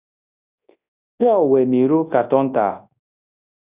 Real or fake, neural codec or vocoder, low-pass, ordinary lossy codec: fake; codec, 24 kHz, 0.9 kbps, WavTokenizer, large speech release; 3.6 kHz; Opus, 64 kbps